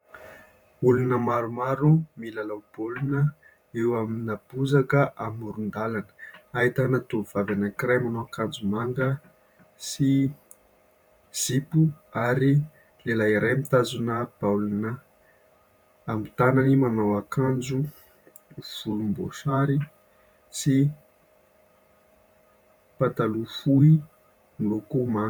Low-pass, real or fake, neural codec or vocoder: 19.8 kHz; fake; vocoder, 44.1 kHz, 128 mel bands every 512 samples, BigVGAN v2